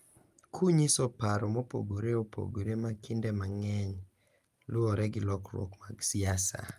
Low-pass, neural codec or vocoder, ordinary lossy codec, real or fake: 14.4 kHz; none; Opus, 32 kbps; real